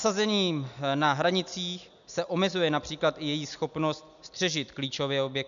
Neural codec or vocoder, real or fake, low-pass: none; real; 7.2 kHz